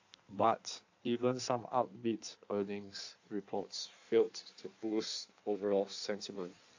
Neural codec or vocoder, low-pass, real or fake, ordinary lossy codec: codec, 16 kHz in and 24 kHz out, 1.1 kbps, FireRedTTS-2 codec; 7.2 kHz; fake; AAC, 48 kbps